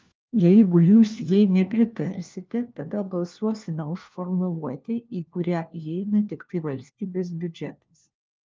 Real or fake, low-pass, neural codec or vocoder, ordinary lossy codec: fake; 7.2 kHz; codec, 16 kHz, 1 kbps, FunCodec, trained on LibriTTS, 50 frames a second; Opus, 32 kbps